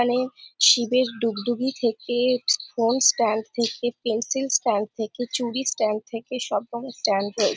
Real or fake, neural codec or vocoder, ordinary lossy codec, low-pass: real; none; none; none